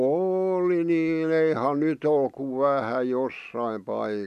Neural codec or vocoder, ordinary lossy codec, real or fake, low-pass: none; none; real; 14.4 kHz